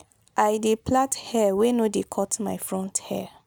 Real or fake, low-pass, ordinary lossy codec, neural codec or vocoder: real; none; none; none